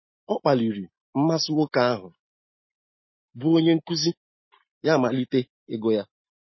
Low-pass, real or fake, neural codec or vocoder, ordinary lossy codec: 7.2 kHz; real; none; MP3, 24 kbps